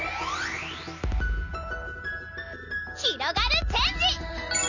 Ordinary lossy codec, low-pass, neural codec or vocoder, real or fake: none; 7.2 kHz; none; real